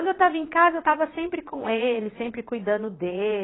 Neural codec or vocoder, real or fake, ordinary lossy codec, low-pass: vocoder, 22.05 kHz, 80 mel bands, Vocos; fake; AAC, 16 kbps; 7.2 kHz